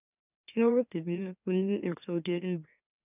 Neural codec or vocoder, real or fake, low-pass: autoencoder, 44.1 kHz, a latent of 192 numbers a frame, MeloTTS; fake; 3.6 kHz